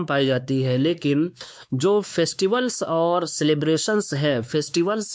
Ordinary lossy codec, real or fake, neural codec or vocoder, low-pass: none; fake; codec, 16 kHz, 2 kbps, X-Codec, WavLM features, trained on Multilingual LibriSpeech; none